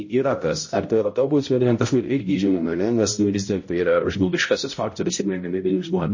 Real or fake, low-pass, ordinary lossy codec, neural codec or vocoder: fake; 7.2 kHz; MP3, 32 kbps; codec, 16 kHz, 0.5 kbps, X-Codec, HuBERT features, trained on balanced general audio